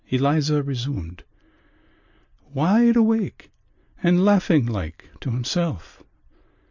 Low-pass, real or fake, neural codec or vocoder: 7.2 kHz; real; none